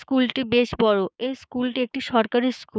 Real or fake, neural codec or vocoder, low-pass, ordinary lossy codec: fake; codec, 16 kHz, 6 kbps, DAC; none; none